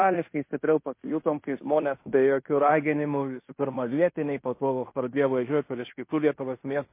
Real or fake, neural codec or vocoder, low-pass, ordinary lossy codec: fake; codec, 16 kHz in and 24 kHz out, 0.9 kbps, LongCat-Audio-Codec, fine tuned four codebook decoder; 3.6 kHz; MP3, 24 kbps